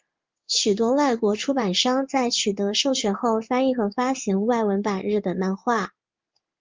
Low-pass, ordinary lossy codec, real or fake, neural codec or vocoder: 7.2 kHz; Opus, 32 kbps; fake; codec, 16 kHz in and 24 kHz out, 1 kbps, XY-Tokenizer